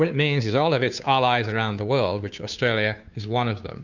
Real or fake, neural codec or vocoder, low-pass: fake; codec, 16 kHz, 2 kbps, FunCodec, trained on Chinese and English, 25 frames a second; 7.2 kHz